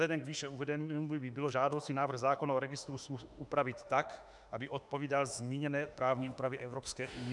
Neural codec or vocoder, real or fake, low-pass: autoencoder, 48 kHz, 32 numbers a frame, DAC-VAE, trained on Japanese speech; fake; 10.8 kHz